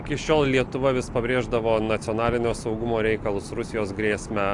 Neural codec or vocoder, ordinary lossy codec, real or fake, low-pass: none; AAC, 64 kbps; real; 10.8 kHz